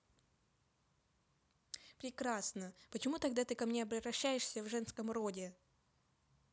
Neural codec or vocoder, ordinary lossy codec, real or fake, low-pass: none; none; real; none